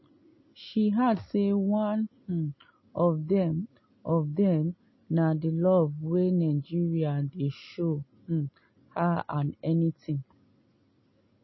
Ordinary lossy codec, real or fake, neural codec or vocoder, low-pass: MP3, 24 kbps; real; none; 7.2 kHz